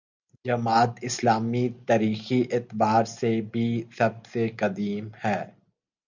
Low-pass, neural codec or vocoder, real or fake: 7.2 kHz; none; real